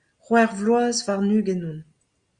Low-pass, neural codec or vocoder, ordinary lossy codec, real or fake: 9.9 kHz; none; Opus, 64 kbps; real